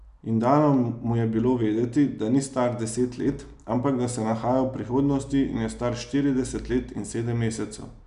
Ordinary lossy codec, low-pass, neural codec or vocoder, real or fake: none; 10.8 kHz; none; real